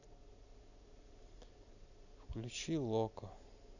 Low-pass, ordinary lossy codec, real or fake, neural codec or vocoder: 7.2 kHz; none; real; none